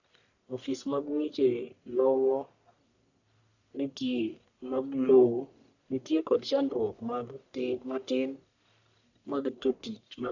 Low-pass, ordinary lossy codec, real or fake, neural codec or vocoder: 7.2 kHz; none; fake; codec, 44.1 kHz, 1.7 kbps, Pupu-Codec